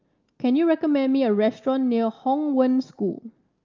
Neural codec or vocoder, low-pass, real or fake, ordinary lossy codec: none; 7.2 kHz; real; Opus, 24 kbps